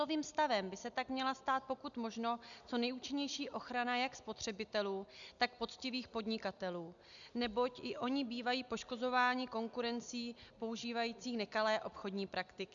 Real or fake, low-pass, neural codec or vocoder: real; 7.2 kHz; none